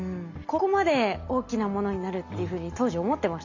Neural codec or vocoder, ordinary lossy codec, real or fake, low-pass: none; none; real; 7.2 kHz